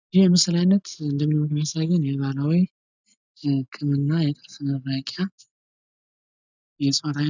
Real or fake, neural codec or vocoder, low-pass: real; none; 7.2 kHz